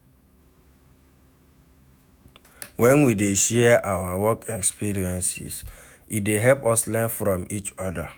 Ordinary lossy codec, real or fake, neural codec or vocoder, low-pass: none; fake; autoencoder, 48 kHz, 128 numbers a frame, DAC-VAE, trained on Japanese speech; none